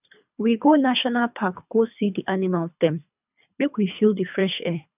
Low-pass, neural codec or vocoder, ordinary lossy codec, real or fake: 3.6 kHz; codec, 24 kHz, 3 kbps, HILCodec; none; fake